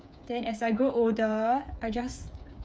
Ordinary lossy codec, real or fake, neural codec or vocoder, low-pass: none; fake; codec, 16 kHz, 8 kbps, FreqCodec, smaller model; none